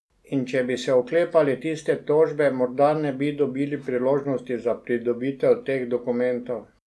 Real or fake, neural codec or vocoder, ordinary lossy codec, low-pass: real; none; none; none